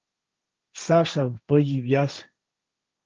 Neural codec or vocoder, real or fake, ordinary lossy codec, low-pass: codec, 16 kHz, 1.1 kbps, Voila-Tokenizer; fake; Opus, 24 kbps; 7.2 kHz